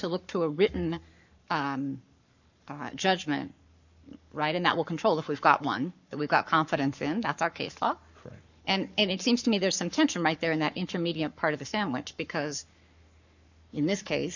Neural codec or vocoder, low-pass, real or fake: codec, 44.1 kHz, 7.8 kbps, Pupu-Codec; 7.2 kHz; fake